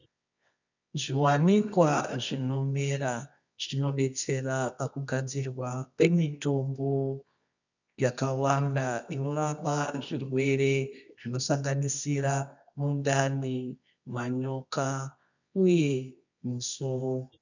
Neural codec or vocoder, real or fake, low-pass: codec, 24 kHz, 0.9 kbps, WavTokenizer, medium music audio release; fake; 7.2 kHz